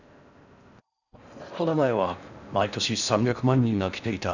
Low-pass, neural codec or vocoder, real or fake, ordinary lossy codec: 7.2 kHz; codec, 16 kHz in and 24 kHz out, 0.6 kbps, FocalCodec, streaming, 4096 codes; fake; none